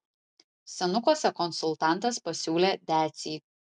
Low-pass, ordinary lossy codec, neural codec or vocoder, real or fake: 10.8 kHz; MP3, 96 kbps; none; real